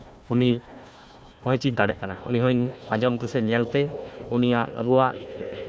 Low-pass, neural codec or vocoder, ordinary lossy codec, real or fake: none; codec, 16 kHz, 1 kbps, FunCodec, trained on Chinese and English, 50 frames a second; none; fake